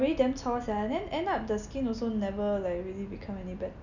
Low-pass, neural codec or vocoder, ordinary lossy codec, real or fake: 7.2 kHz; none; none; real